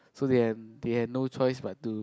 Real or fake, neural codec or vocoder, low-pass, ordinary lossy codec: real; none; none; none